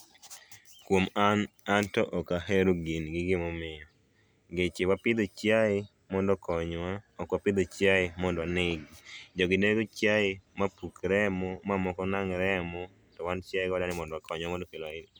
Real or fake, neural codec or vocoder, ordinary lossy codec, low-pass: real; none; none; none